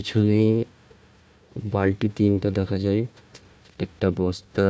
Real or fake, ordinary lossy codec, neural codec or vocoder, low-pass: fake; none; codec, 16 kHz, 1 kbps, FunCodec, trained on Chinese and English, 50 frames a second; none